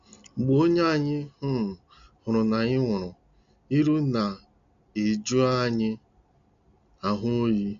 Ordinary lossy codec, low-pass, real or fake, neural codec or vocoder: none; 7.2 kHz; real; none